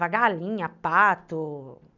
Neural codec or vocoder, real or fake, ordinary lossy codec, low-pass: none; real; none; 7.2 kHz